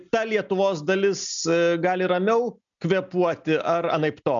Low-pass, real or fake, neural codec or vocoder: 7.2 kHz; real; none